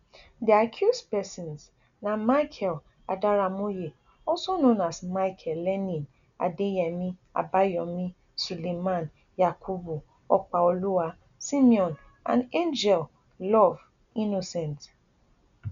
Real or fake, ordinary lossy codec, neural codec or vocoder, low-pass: real; none; none; 7.2 kHz